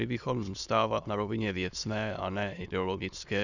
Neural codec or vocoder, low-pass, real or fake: autoencoder, 22.05 kHz, a latent of 192 numbers a frame, VITS, trained on many speakers; 7.2 kHz; fake